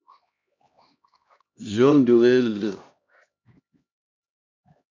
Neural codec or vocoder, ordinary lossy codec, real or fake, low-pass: codec, 16 kHz, 1 kbps, X-Codec, HuBERT features, trained on LibriSpeech; MP3, 64 kbps; fake; 7.2 kHz